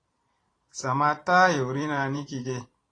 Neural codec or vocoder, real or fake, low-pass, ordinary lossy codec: vocoder, 44.1 kHz, 128 mel bands every 512 samples, BigVGAN v2; fake; 9.9 kHz; AAC, 32 kbps